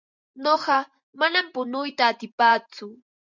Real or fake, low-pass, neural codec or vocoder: fake; 7.2 kHz; vocoder, 44.1 kHz, 128 mel bands every 512 samples, BigVGAN v2